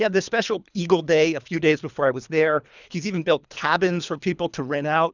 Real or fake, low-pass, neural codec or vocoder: fake; 7.2 kHz; codec, 24 kHz, 3 kbps, HILCodec